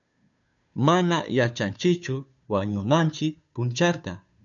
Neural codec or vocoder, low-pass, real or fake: codec, 16 kHz, 2 kbps, FunCodec, trained on Chinese and English, 25 frames a second; 7.2 kHz; fake